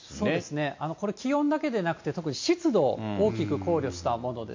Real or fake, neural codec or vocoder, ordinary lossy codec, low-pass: real; none; MP3, 48 kbps; 7.2 kHz